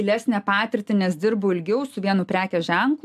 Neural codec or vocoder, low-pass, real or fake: none; 14.4 kHz; real